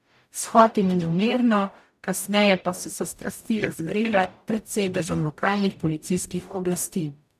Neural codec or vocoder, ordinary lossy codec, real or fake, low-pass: codec, 44.1 kHz, 0.9 kbps, DAC; none; fake; 14.4 kHz